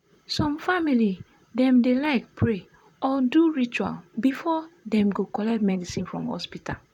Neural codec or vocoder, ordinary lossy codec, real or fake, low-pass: vocoder, 44.1 kHz, 128 mel bands, Pupu-Vocoder; none; fake; 19.8 kHz